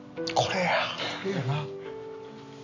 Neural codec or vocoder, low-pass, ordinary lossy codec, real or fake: none; 7.2 kHz; none; real